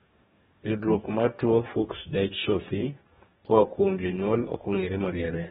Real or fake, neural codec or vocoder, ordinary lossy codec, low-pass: fake; codec, 44.1 kHz, 2.6 kbps, DAC; AAC, 16 kbps; 19.8 kHz